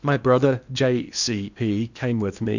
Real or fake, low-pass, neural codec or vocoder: fake; 7.2 kHz; codec, 16 kHz in and 24 kHz out, 0.8 kbps, FocalCodec, streaming, 65536 codes